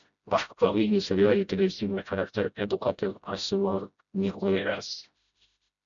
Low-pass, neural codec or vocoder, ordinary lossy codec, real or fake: 7.2 kHz; codec, 16 kHz, 0.5 kbps, FreqCodec, smaller model; MP3, 96 kbps; fake